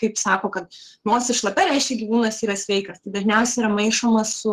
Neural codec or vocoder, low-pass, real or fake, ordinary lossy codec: codec, 44.1 kHz, 7.8 kbps, Pupu-Codec; 14.4 kHz; fake; Opus, 16 kbps